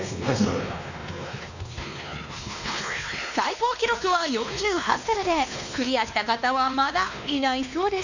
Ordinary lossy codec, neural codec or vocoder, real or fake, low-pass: none; codec, 16 kHz, 2 kbps, X-Codec, WavLM features, trained on Multilingual LibriSpeech; fake; 7.2 kHz